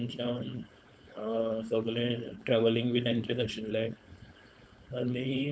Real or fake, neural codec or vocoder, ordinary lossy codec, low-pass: fake; codec, 16 kHz, 4.8 kbps, FACodec; none; none